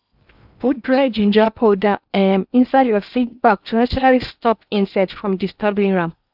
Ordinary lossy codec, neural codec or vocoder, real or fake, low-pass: none; codec, 16 kHz in and 24 kHz out, 0.8 kbps, FocalCodec, streaming, 65536 codes; fake; 5.4 kHz